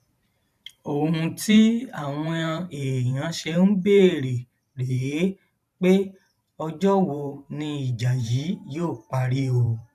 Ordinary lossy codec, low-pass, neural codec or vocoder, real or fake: none; 14.4 kHz; vocoder, 44.1 kHz, 128 mel bands every 512 samples, BigVGAN v2; fake